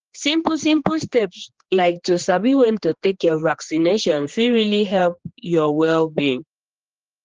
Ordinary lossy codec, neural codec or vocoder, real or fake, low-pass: Opus, 16 kbps; codec, 16 kHz, 2 kbps, X-Codec, HuBERT features, trained on general audio; fake; 7.2 kHz